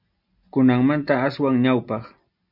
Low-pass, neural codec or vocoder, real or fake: 5.4 kHz; none; real